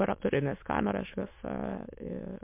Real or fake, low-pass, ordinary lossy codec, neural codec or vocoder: fake; 3.6 kHz; MP3, 32 kbps; autoencoder, 22.05 kHz, a latent of 192 numbers a frame, VITS, trained on many speakers